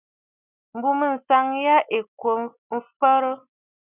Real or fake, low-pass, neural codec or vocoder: real; 3.6 kHz; none